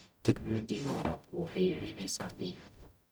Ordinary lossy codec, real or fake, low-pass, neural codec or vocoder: none; fake; none; codec, 44.1 kHz, 0.9 kbps, DAC